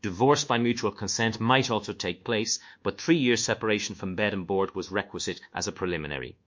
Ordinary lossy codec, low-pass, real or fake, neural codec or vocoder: MP3, 48 kbps; 7.2 kHz; fake; codec, 24 kHz, 1.2 kbps, DualCodec